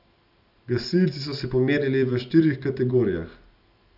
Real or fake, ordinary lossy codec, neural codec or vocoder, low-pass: real; none; none; 5.4 kHz